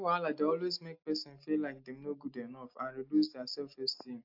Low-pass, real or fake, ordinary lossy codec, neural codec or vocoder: 5.4 kHz; real; AAC, 48 kbps; none